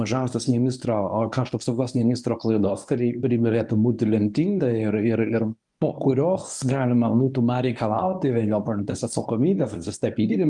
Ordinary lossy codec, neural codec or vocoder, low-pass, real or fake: Opus, 32 kbps; codec, 24 kHz, 0.9 kbps, WavTokenizer, medium speech release version 1; 10.8 kHz; fake